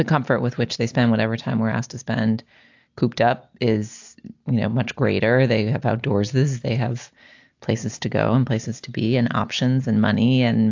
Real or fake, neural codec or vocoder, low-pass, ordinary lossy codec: real; none; 7.2 kHz; AAC, 48 kbps